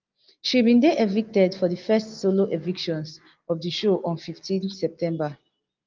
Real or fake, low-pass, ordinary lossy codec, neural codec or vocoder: real; 7.2 kHz; Opus, 32 kbps; none